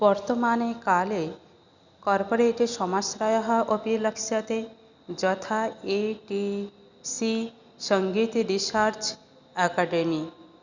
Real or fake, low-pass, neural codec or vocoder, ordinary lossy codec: real; 7.2 kHz; none; Opus, 64 kbps